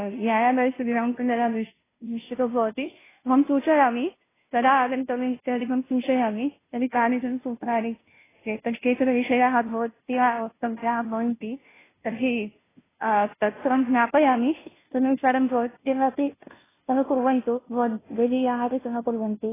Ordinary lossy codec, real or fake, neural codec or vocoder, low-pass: AAC, 16 kbps; fake; codec, 16 kHz, 0.5 kbps, FunCodec, trained on Chinese and English, 25 frames a second; 3.6 kHz